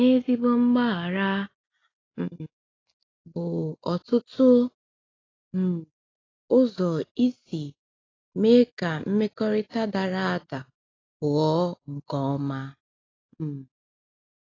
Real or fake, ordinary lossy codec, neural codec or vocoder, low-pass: real; AAC, 32 kbps; none; 7.2 kHz